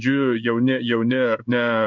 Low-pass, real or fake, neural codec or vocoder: 7.2 kHz; fake; codec, 16 kHz in and 24 kHz out, 1 kbps, XY-Tokenizer